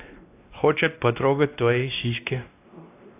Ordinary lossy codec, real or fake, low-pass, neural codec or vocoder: AAC, 24 kbps; fake; 3.6 kHz; codec, 16 kHz, 1 kbps, X-Codec, WavLM features, trained on Multilingual LibriSpeech